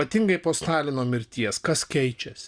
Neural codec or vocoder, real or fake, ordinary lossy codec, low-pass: none; real; Opus, 64 kbps; 9.9 kHz